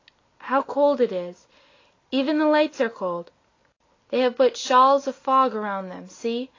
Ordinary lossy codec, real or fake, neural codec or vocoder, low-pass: AAC, 32 kbps; real; none; 7.2 kHz